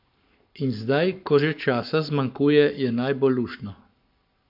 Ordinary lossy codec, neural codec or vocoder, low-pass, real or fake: MP3, 48 kbps; codec, 44.1 kHz, 7.8 kbps, Pupu-Codec; 5.4 kHz; fake